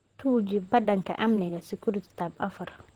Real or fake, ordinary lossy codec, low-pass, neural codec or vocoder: fake; Opus, 16 kbps; 19.8 kHz; vocoder, 44.1 kHz, 128 mel bands, Pupu-Vocoder